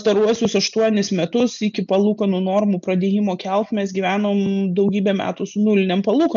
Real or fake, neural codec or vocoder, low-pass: real; none; 7.2 kHz